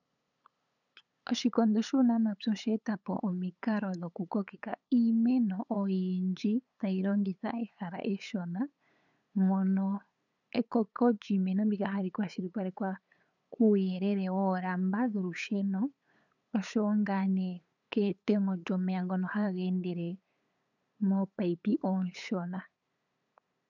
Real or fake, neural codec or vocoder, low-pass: fake; codec, 16 kHz, 8 kbps, FunCodec, trained on LibriTTS, 25 frames a second; 7.2 kHz